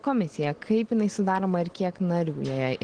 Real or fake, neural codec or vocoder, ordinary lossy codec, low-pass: real; none; Opus, 16 kbps; 9.9 kHz